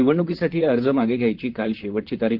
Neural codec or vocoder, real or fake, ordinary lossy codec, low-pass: vocoder, 44.1 kHz, 128 mel bands, Pupu-Vocoder; fake; Opus, 16 kbps; 5.4 kHz